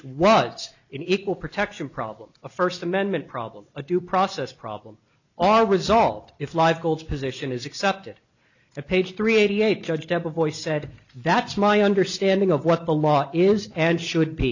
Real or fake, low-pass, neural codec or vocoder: real; 7.2 kHz; none